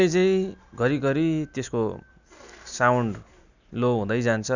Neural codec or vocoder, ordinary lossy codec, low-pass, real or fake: none; none; 7.2 kHz; real